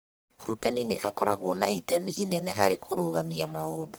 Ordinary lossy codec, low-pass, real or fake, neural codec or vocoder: none; none; fake; codec, 44.1 kHz, 1.7 kbps, Pupu-Codec